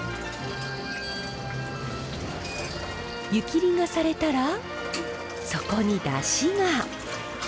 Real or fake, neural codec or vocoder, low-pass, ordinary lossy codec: real; none; none; none